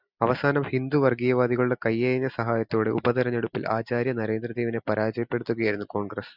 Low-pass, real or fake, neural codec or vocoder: 5.4 kHz; real; none